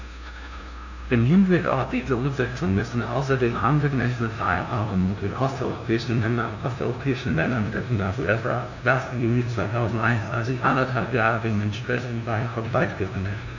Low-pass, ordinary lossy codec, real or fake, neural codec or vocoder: 7.2 kHz; none; fake; codec, 16 kHz, 0.5 kbps, FunCodec, trained on LibriTTS, 25 frames a second